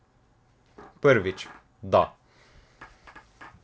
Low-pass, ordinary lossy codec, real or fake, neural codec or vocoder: none; none; real; none